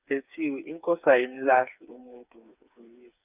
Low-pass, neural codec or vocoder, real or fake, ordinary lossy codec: 3.6 kHz; codec, 16 kHz, 4 kbps, FreqCodec, smaller model; fake; none